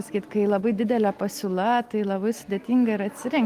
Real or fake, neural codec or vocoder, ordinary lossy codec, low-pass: real; none; Opus, 24 kbps; 14.4 kHz